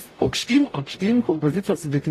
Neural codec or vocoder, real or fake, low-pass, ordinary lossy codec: codec, 44.1 kHz, 0.9 kbps, DAC; fake; 14.4 kHz; AAC, 48 kbps